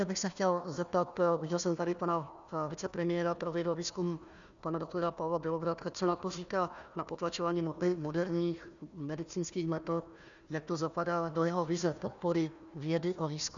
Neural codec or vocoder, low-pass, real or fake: codec, 16 kHz, 1 kbps, FunCodec, trained on Chinese and English, 50 frames a second; 7.2 kHz; fake